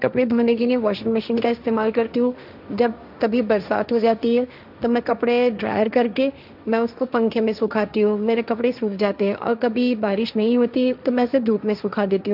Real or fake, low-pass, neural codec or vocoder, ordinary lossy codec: fake; 5.4 kHz; codec, 16 kHz, 1.1 kbps, Voila-Tokenizer; none